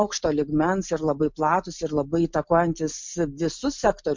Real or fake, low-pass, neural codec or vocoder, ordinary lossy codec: real; 7.2 kHz; none; MP3, 64 kbps